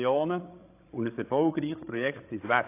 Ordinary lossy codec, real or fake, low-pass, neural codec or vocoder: AAC, 24 kbps; fake; 3.6 kHz; codec, 16 kHz, 16 kbps, FreqCodec, larger model